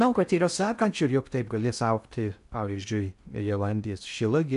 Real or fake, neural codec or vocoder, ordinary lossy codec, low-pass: fake; codec, 16 kHz in and 24 kHz out, 0.6 kbps, FocalCodec, streaming, 2048 codes; MP3, 96 kbps; 10.8 kHz